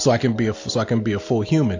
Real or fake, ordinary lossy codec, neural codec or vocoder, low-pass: real; AAC, 48 kbps; none; 7.2 kHz